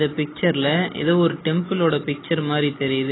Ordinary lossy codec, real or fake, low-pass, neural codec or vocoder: AAC, 16 kbps; real; 7.2 kHz; none